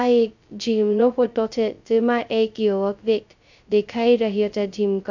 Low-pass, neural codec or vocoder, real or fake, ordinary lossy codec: 7.2 kHz; codec, 16 kHz, 0.2 kbps, FocalCodec; fake; none